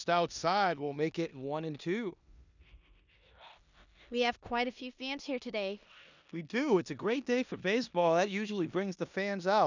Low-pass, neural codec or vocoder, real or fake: 7.2 kHz; codec, 16 kHz in and 24 kHz out, 0.9 kbps, LongCat-Audio-Codec, four codebook decoder; fake